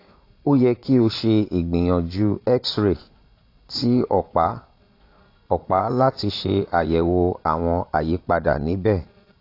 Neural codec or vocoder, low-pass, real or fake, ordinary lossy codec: vocoder, 22.05 kHz, 80 mel bands, Vocos; 5.4 kHz; fake; AAC, 32 kbps